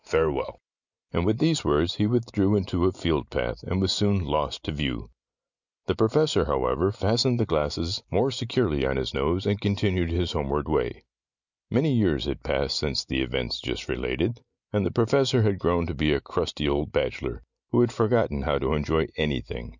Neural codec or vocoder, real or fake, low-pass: none; real; 7.2 kHz